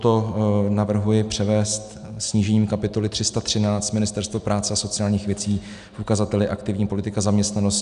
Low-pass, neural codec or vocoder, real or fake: 10.8 kHz; none; real